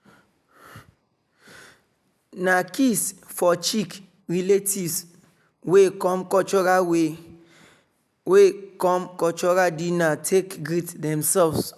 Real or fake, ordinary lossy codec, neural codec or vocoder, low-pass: real; none; none; 14.4 kHz